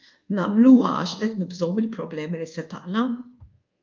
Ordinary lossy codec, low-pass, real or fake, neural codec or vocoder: Opus, 32 kbps; 7.2 kHz; fake; codec, 24 kHz, 1.2 kbps, DualCodec